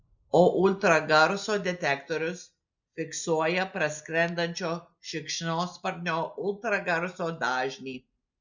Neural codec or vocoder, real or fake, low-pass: none; real; 7.2 kHz